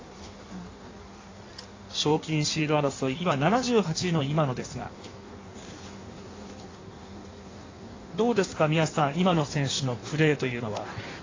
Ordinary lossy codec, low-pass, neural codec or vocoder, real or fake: AAC, 32 kbps; 7.2 kHz; codec, 16 kHz in and 24 kHz out, 1.1 kbps, FireRedTTS-2 codec; fake